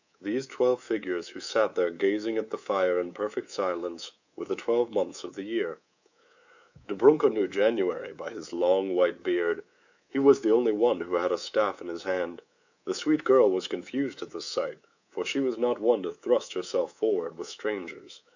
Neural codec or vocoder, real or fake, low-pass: codec, 24 kHz, 3.1 kbps, DualCodec; fake; 7.2 kHz